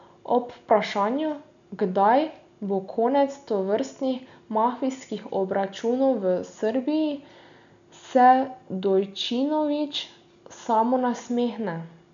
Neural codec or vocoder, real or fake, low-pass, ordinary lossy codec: none; real; 7.2 kHz; none